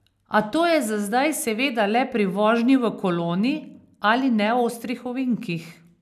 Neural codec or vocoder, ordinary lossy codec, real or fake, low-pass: none; none; real; 14.4 kHz